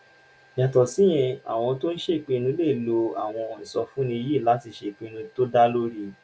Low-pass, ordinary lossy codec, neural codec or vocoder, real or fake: none; none; none; real